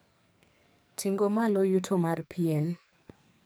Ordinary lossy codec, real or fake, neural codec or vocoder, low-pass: none; fake; codec, 44.1 kHz, 2.6 kbps, SNAC; none